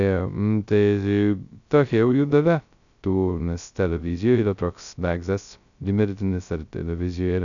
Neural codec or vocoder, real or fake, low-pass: codec, 16 kHz, 0.2 kbps, FocalCodec; fake; 7.2 kHz